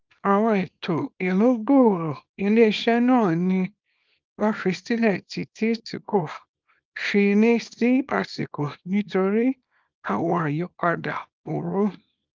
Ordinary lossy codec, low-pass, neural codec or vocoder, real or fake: Opus, 24 kbps; 7.2 kHz; codec, 24 kHz, 0.9 kbps, WavTokenizer, small release; fake